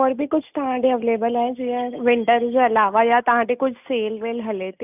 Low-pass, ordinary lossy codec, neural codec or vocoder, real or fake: 3.6 kHz; none; none; real